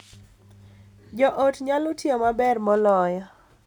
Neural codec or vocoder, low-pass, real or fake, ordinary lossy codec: none; 19.8 kHz; real; none